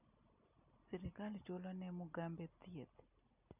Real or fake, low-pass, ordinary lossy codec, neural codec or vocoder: real; 3.6 kHz; none; none